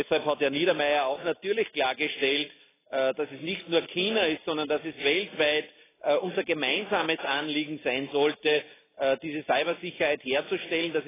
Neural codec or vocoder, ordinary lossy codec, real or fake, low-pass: none; AAC, 16 kbps; real; 3.6 kHz